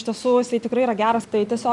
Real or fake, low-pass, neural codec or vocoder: real; 10.8 kHz; none